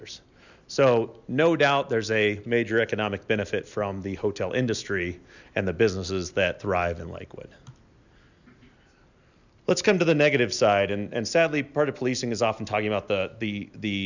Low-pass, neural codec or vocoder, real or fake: 7.2 kHz; none; real